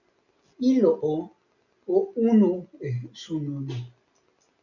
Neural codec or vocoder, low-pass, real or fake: none; 7.2 kHz; real